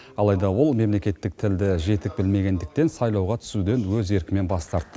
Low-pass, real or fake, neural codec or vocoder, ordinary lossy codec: none; real; none; none